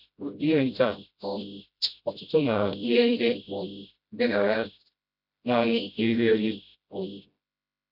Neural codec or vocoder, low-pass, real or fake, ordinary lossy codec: codec, 16 kHz, 0.5 kbps, FreqCodec, smaller model; 5.4 kHz; fake; none